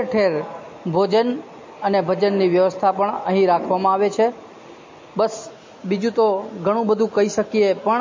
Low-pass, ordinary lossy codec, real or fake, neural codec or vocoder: 7.2 kHz; MP3, 32 kbps; real; none